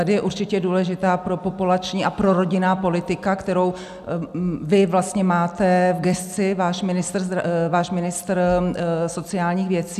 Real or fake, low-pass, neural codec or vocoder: real; 14.4 kHz; none